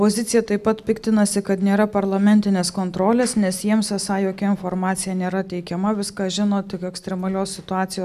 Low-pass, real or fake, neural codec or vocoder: 14.4 kHz; real; none